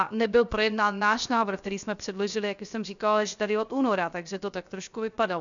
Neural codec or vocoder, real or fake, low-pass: codec, 16 kHz, 0.7 kbps, FocalCodec; fake; 7.2 kHz